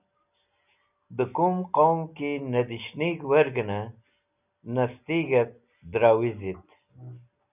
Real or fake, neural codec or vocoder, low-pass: real; none; 3.6 kHz